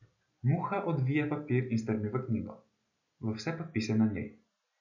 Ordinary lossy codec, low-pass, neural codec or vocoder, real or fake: none; 7.2 kHz; none; real